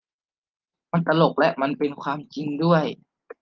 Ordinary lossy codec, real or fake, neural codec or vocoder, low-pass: Opus, 32 kbps; real; none; 7.2 kHz